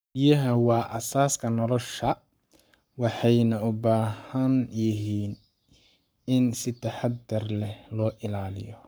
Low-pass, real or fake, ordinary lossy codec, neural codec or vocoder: none; fake; none; codec, 44.1 kHz, 7.8 kbps, Pupu-Codec